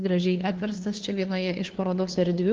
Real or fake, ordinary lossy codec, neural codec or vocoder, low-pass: fake; Opus, 16 kbps; codec, 16 kHz, 2 kbps, FunCodec, trained on LibriTTS, 25 frames a second; 7.2 kHz